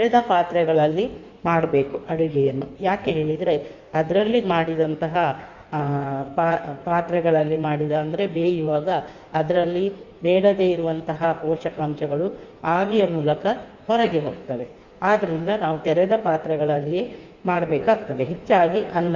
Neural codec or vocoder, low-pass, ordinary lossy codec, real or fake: codec, 16 kHz in and 24 kHz out, 1.1 kbps, FireRedTTS-2 codec; 7.2 kHz; none; fake